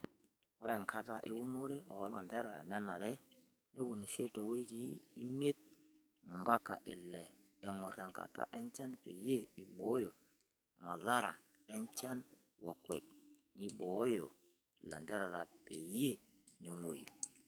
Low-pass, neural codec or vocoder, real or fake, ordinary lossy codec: none; codec, 44.1 kHz, 2.6 kbps, SNAC; fake; none